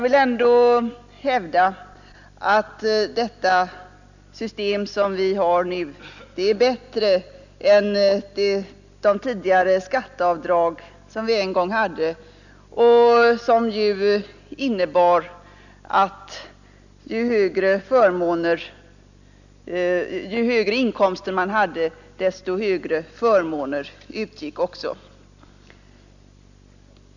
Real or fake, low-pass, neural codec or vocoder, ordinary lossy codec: real; 7.2 kHz; none; none